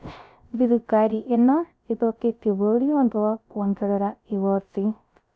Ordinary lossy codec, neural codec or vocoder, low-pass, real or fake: none; codec, 16 kHz, 0.3 kbps, FocalCodec; none; fake